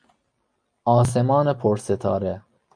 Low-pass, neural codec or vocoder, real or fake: 9.9 kHz; none; real